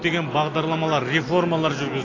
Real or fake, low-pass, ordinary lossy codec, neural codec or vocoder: real; 7.2 kHz; AAC, 32 kbps; none